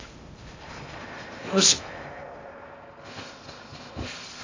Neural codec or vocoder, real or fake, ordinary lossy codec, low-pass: codec, 16 kHz in and 24 kHz out, 0.6 kbps, FocalCodec, streaming, 2048 codes; fake; AAC, 32 kbps; 7.2 kHz